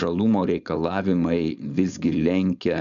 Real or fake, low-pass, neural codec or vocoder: fake; 7.2 kHz; codec, 16 kHz, 4.8 kbps, FACodec